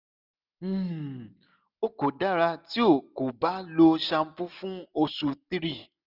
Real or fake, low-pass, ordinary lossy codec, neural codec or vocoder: real; 5.4 kHz; none; none